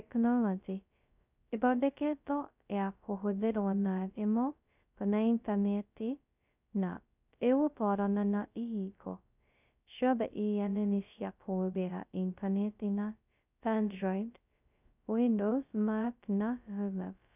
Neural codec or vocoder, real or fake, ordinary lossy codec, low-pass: codec, 16 kHz, 0.2 kbps, FocalCodec; fake; none; 3.6 kHz